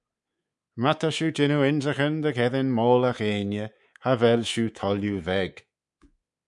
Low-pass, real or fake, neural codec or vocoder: 10.8 kHz; fake; codec, 24 kHz, 3.1 kbps, DualCodec